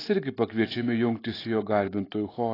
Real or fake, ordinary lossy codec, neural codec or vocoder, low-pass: real; AAC, 24 kbps; none; 5.4 kHz